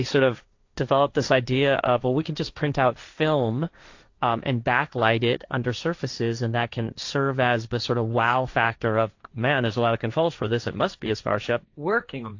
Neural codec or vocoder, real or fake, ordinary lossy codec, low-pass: codec, 16 kHz, 1.1 kbps, Voila-Tokenizer; fake; AAC, 48 kbps; 7.2 kHz